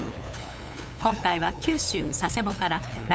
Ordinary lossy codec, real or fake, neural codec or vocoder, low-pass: none; fake; codec, 16 kHz, 8 kbps, FunCodec, trained on LibriTTS, 25 frames a second; none